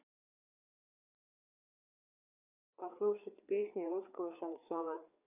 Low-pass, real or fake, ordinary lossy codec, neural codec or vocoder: 3.6 kHz; fake; none; codec, 16 kHz, 4 kbps, FreqCodec, larger model